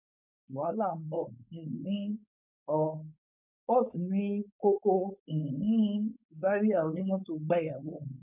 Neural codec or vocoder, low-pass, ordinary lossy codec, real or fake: codec, 16 kHz, 4.8 kbps, FACodec; 3.6 kHz; none; fake